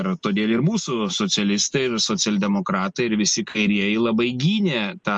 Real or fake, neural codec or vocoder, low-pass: real; none; 9.9 kHz